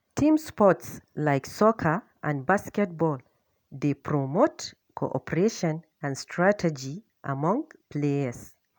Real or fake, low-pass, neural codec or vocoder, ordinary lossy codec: real; none; none; none